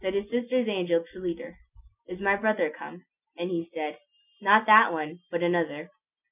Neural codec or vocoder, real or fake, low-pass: none; real; 3.6 kHz